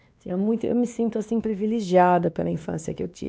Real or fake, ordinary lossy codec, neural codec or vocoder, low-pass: fake; none; codec, 16 kHz, 2 kbps, X-Codec, WavLM features, trained on Multilingual LibriSpeech; none